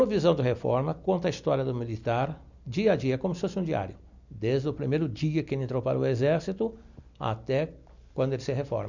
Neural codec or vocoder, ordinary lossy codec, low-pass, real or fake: none; none; 7.2 kHz; real